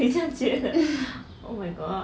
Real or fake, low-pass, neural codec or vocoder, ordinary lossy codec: real; none; none; none